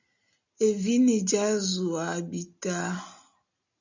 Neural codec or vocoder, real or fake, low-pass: none; real; 7.2 kHz